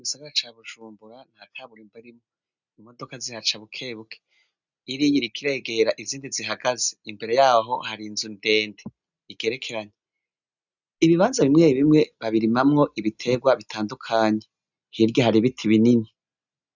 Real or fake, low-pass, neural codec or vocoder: real; 7.2 kHz; none